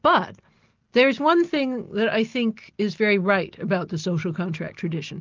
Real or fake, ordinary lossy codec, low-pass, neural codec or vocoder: real; Opus, 24 kbps; 7.2 kHz; none